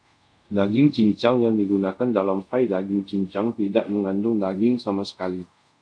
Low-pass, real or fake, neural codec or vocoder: 9.9 kHz; fake; codec, 24 kHz, 0.5 kbps, DualCodec